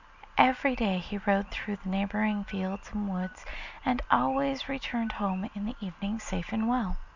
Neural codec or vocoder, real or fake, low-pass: none; real; 7.2 kHz